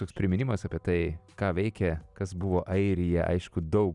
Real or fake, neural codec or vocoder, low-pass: real; none; 10.8 kHz